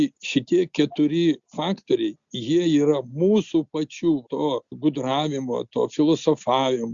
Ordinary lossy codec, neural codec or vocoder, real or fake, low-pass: Opus, 64 kbps; none; real; 7.2 kHz